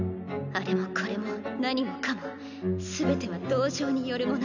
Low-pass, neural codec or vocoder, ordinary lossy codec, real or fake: 7.2 kHz; none; none; real